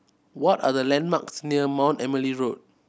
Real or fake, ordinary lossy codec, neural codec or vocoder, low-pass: real; none; none; none